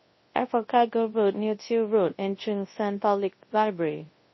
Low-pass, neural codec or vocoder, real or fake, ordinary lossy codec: 7.2 kHz; codec, 24 kHz, 0.9 kbps, WavTokenizer, large speech release; fake; MP3, 24 kbps